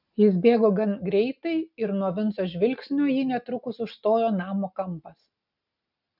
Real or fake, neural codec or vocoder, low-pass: real; none; 5.4 kHz